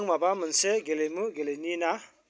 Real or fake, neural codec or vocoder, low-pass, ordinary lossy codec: real; none; none; none